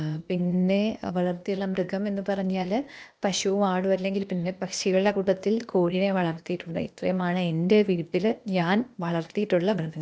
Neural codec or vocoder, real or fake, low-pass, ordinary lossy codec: codec, 16 kHz, 0.8 kbps, ZipCodec; fake; none; none